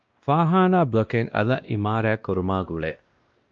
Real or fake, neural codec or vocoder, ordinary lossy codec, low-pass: fake; codec, 16 kHz, 1 kbps, X-Codec, WavLM features, trained on Multilingual LibriSpeech; Opus, 24 kbps; 7.2 kHz